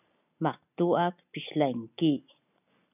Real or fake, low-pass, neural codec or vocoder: real; 3.6 kHz; none